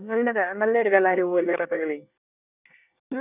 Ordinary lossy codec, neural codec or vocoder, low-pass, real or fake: none; codec, 24 kHz, 1 kbps, SNAC; 3.6 kHz; fake